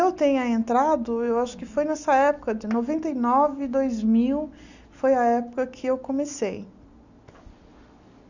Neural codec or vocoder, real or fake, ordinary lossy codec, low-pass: none; real; none; 7.2 kHz